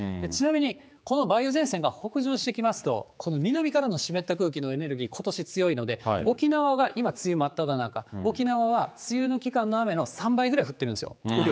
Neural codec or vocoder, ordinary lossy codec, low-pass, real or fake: codec, 16 kHz, 4 kbps, X-Codec, HuBERT features, trained on general audio; none; none; fake